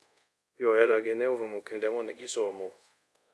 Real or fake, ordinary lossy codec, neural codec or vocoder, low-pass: fake; none; codec, 24 kHz, 0.5 kbps, DualCodec; none